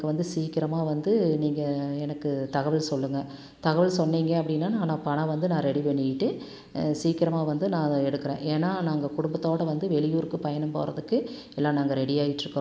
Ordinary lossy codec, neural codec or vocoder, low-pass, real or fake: none; none; none; real